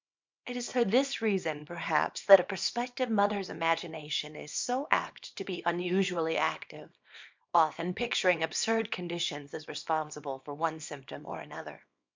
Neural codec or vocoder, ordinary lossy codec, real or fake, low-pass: codec, 24 kHz, 0.9 kbps, WavTokenizer, small release; MP3, 64 kbps; fake; 7.2 kHz